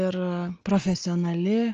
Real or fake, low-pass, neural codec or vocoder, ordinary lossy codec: real; 7.2 kHz; none; Opus, 24 kbps